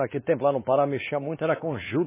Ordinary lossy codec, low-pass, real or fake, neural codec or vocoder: MP3, 16 kbps; 3.6 kHz; fake; codec, 16 kHz, 2 kbps, X-Codec, WavLM features, trained on Multilingual LibriSpeech